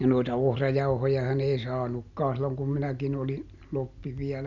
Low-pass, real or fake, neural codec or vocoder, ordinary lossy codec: 7.2 kHz; real; none; none